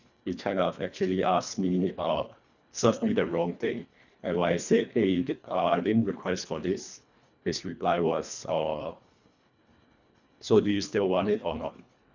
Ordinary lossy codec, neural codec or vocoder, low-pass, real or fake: none; codec, 24 kHz, 1.5 kbps, HILCodec; 7.2 kHz; fake